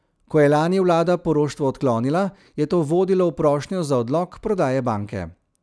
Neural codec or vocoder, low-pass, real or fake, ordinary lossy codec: none; none; real; none